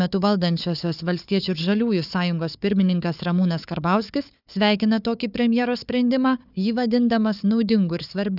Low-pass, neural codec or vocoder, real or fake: 5.4 kHz; codec, 16 kHz, 4 kbps, FunCodec, trained on Chinese and English, 50 frames a second; fake